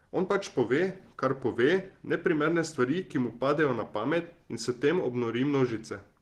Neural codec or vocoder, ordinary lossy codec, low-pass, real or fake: none; Opus, 16 kbps; 14.4 kHz; real